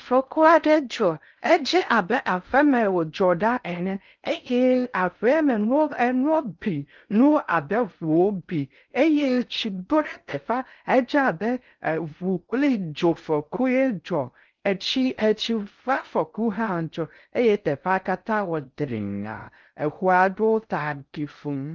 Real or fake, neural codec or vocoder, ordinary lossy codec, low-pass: fake; codec, 16 kHz in and 24 kHz out, 0.6 kbps, FocalCodec, streaming, 4096 codes; Opus, 24 kbps; 7.2 kHz